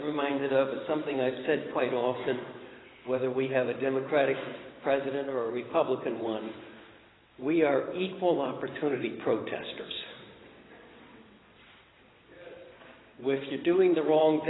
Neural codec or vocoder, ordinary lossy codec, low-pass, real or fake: vocoder, 22.05 kHz, 80 mel bands, WaveNeXt; AAC, 16 kbps; 7.2 kHz; fake